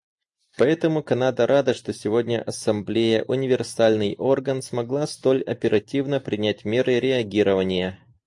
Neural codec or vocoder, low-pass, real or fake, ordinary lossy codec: none; 10.8 kHz; real; AAC, 64 kbps